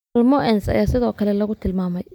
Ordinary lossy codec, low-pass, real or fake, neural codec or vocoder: none; 19.8 kHz; real; none